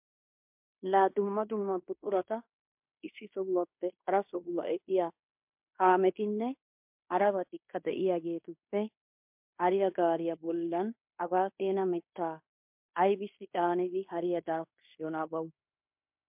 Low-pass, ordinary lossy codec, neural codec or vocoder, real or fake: 3.6 kHz; MP3, 32 kbps; codec, 16 kHz in and 24 kHz out, 0.9 kbps, LongCat-Audio-Codec, fine tuned four codebook decoder; fake